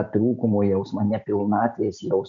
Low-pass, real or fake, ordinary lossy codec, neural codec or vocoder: 7.2 kHz; real; MP3, 64 kbps; none